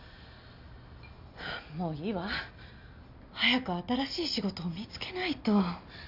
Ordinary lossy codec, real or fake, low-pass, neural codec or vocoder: none; real; 5.4 kHz; none